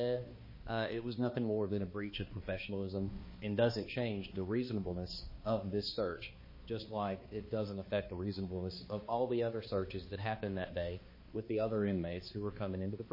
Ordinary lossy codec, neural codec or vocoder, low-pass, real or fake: MP3, 24 kbps; codec, 16 kHz, 2 kbps, X-Codec, HuBERT features, trained on balanced general audio; 5.4 kHz; fake